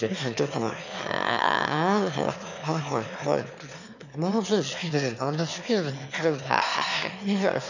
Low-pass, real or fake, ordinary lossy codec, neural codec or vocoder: 7.2 kHz; fake; none; autoencoder, 22.05 kHz, a latent of 192 numbers a frame, VITS, trained on one speaker